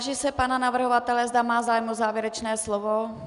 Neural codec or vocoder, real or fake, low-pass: none; real; 10.8 kHz